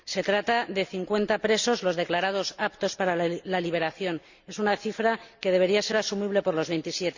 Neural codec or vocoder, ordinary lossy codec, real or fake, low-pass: none; Opus, 64 kbps; real; 7.2 kHz